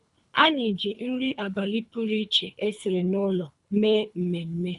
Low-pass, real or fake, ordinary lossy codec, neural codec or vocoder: 10.8 kHz; fake; none; codec, 24 kHz, 3 kbps, HILCodec